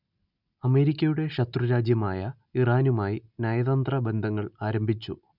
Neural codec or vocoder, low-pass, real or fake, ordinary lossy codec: none; 5.4 kHz; real; none